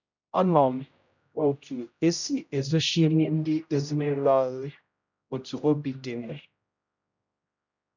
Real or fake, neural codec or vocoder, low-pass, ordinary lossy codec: fake; codec, 16 kHz, 0.5 kbps, X-Codec, HuBERT features, trained on general audio; 7.2 kHz; none